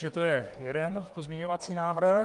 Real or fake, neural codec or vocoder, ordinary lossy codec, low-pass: fake; codec, 24 kHz, 1 kbps, SNAC; Opus, 32 kbps; 10.8 kHz